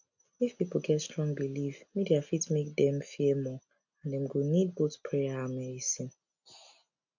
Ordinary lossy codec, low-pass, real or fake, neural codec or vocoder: none; 7.2 kHz; real; none